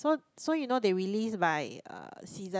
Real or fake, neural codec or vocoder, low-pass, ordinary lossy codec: real; none; none; none